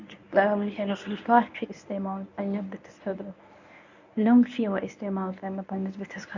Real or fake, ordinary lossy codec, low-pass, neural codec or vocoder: fake; none; 7.2 kHz; codec, 24 kHz, 0.9 kbps, WavTokenizer, medium speech release version 1